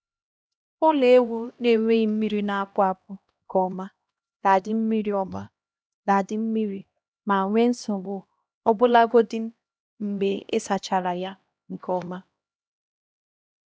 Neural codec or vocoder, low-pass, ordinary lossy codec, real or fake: codec, 16 kHz, 1 kbps, X-Codec, HuBERT features, trained on LibriSpeech; none; none; fake